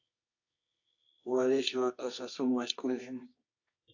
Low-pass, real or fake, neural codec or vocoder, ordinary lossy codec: 7.2 kHz; fake; codec, 24 kHz, 0.9 kbps, WavTokenizer, medium music audio release; AAC, 48 kbps